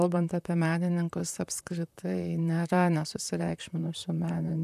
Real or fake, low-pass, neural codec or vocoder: fake; 14.4 kHz; vocoder, 44.1 kHz, 128 mel bands, Pupu-Vocoder